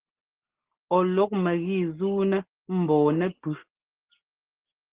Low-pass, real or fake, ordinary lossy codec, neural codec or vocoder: 3.6 kHz; real; Opus, 16 kbps; none